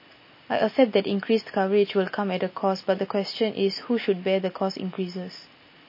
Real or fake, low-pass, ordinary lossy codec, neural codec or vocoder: real; 5.4 kHz; MP3, 24 kbps; none